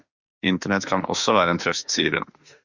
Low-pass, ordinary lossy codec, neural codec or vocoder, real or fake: 7.2 kHz; Opus, 64 kbps; autoencoder, 48 kHz, 32 numbers a frame, DAC-VAE, trained on Japanese speech; fake